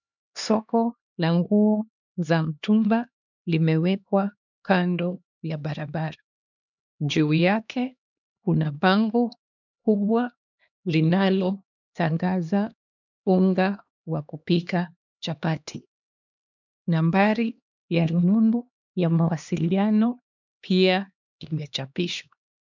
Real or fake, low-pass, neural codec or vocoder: fake; 7.2 kHz; codec, 16 kHz, 2 kbps, X-Codec, HuBERT features, trained on LibriSpeech